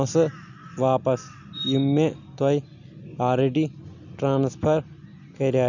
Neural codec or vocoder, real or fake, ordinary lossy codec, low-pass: none; real; none; 7.2 kHz